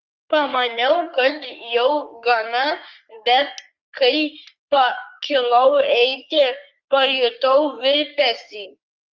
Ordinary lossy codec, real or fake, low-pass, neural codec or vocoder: Opus, 32 kbps; fake; 7.2 kHz; codec, 44.1 kHz, 3.4 kbps, Pupu-Codec